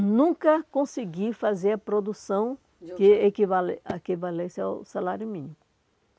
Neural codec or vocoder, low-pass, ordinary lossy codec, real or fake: none; none; none; real